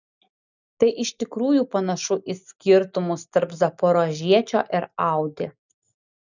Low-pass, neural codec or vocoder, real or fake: 7.2 kHz; none; real